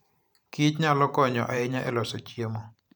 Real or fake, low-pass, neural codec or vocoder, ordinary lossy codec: fake; none; vocoder, 44.1 kHz, 128 mel bands every 512 samples, BigVGAN v2; none